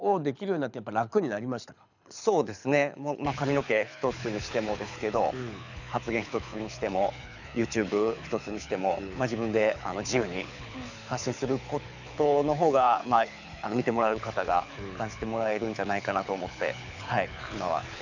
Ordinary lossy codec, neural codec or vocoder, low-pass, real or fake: none; codec, 24 kHz, 6 kbps, HILCodec; 7.2 kHz; fake